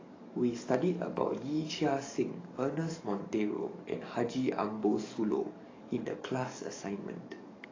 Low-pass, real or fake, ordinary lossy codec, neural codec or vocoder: 7.2 kHz; fake; AAC, 32 kbps; codec, 44.1 kHz, 7.8 kbps, DAC